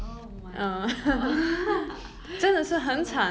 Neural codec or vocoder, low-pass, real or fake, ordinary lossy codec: none; none; real; none